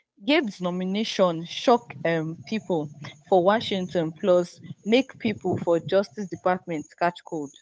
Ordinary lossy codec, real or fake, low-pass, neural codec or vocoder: none; fake; none; codec, 16 kHz, 8 kbps, FunCodec, trained on Chinese and English, 25 frames a second